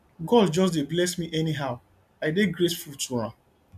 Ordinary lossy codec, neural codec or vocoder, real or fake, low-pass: none; none; real; 14.4 kHz